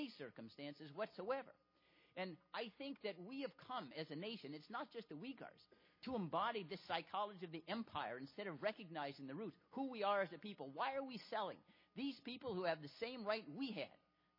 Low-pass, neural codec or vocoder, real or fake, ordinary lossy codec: 5.4 kHz; none; real; MP3, 24 kbps